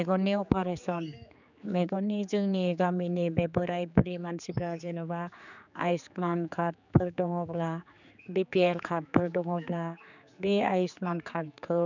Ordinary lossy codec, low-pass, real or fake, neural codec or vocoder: none; 7.2 kHz; fake; codec, 16 kHz, 4 kbps, X-Codec, HuBERT features, trained on general audio